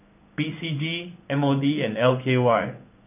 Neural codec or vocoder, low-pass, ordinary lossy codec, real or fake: codec, 16 kHz in and 24 kHz out, 1 kbps, XY-Tokenizer; 3.6 kHz; none; fake